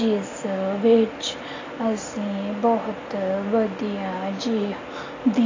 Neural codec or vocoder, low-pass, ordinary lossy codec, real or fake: none; 7.2 kHz; AAC, 32 kbps; real